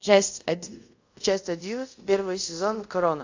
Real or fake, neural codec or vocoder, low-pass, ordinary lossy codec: fake; codec, 24 kHz, 0.5 kbps, DualCodec; 7.2 kHz; AAC, 48 kbps